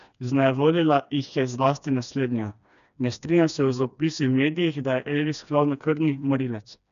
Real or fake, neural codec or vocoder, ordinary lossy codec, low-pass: fake; codec, 16 kHz, 2 kbps, FreqCodec, smaller model; none; 7.2 kHz